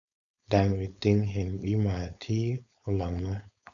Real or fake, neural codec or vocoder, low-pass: fake; codec, 16 kHz, 4.8 kbps, FACodec; 7.2 kHz